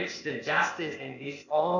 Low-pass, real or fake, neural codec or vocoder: 7.2 kHz; fake; codec, 16 kHz, 0.8 kbps, ZipCodec